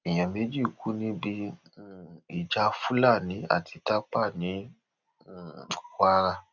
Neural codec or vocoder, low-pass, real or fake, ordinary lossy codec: none; 7.2 kHz; real; none